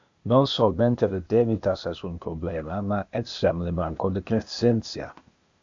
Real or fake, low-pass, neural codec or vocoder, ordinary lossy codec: fake; 7.2 kHz; codec, 16 kHz, 0.8 kbps, ZipCodec; AAC, 64 kbps